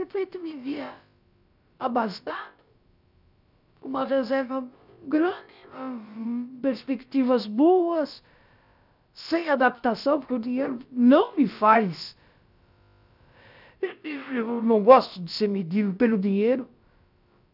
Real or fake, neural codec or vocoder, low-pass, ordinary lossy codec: fake; codec, 16 kHz, about 1 kbps, DyCAST, with the encoder's durations; 5.4 kHz; none